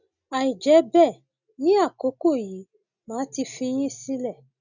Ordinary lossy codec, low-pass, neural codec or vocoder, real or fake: none; 7.2 kHz; none; real